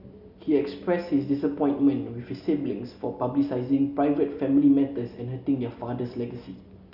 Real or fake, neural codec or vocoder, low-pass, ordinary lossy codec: real; none; 5.4 kHz; none